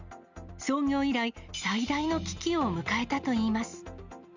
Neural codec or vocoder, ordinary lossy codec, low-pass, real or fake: none; Opus, 64 kbps; 7.2 kHz; real